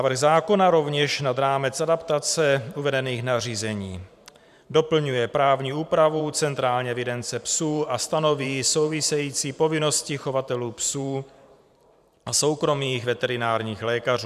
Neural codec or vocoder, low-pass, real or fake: vocoder, 44.1 kHz, 128 mel bands every 512 samples, BigVGAN v2; 14.4 kHz; fake